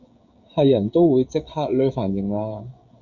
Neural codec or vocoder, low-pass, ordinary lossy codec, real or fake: codec, 16 kHz, 16 kbps, FreqCodec, smaller model; 7.2 kHz; Opus, 64 kbps; fake